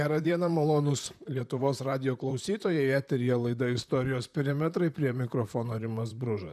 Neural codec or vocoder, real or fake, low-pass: vocoder, 44.1 kHz, 128 mel bands, Pupu-Vocoder; fake; 14.4 kHz